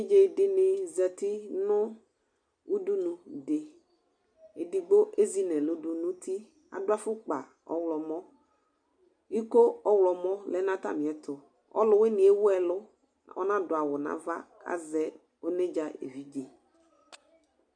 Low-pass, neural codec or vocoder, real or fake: 9.9 kHz; none; real